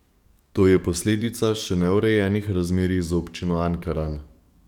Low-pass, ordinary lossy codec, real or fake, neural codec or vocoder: 19.8 kHz; none; fake; codec, 44.1 kHz, 7.8 kbps, DAC